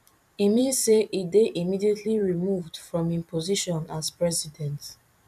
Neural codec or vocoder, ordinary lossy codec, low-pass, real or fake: vocoder, 44.1 kHz, 128 mel bands every 256 samples, BigVGAN v2; none; 14.4 kHz; fake